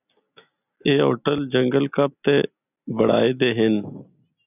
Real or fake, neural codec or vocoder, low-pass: real; none; 3.6 kHz